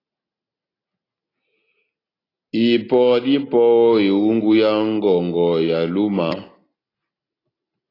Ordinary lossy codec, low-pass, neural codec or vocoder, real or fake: AAC, 24 kbps; 5.4 kHz; none; real